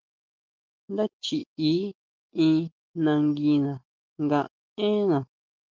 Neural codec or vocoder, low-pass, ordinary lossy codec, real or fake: none; 7.2 kHz; Opus, 32 kbps; real